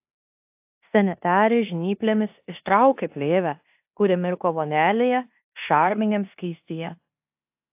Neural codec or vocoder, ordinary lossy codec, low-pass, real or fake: codec, 16 kHz in and 24 kHz out, 0.9 kbps, LongCat-Audio-Codec, four codebook decoder; AAC, 32 kbps; 3.6 kHz; fake